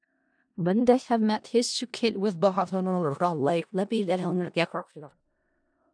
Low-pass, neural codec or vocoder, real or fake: 9.9 kHz; codec, 16 kHz in and 24 kHz out, 0.4 kbps, LongCat-Audio-Codec, four codebook decoder; fake